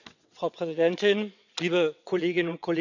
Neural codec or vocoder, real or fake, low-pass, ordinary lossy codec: vocoder, 22.05 kHz, 80 mel bands, WaveNeXt; fake; 7.2 kHz; none